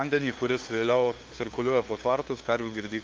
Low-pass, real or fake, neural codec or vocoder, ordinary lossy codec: 7.2 kHz; fake; codec, 16 kHz, 2 kbps, FunCodec, trained on LibriTTS, 25 frames a second; Opus, 32 kbps